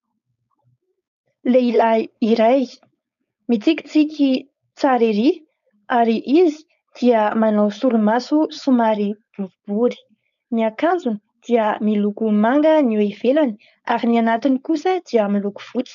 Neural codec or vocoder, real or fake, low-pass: codec, 16 kHz, 4.8 kbps, FACodec; fake; 7.2 kHz